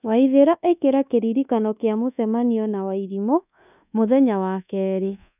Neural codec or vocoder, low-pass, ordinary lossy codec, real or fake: codec, 24 kHz, 1.2 kbps, DualCodec; 3.6 kHz; none; fake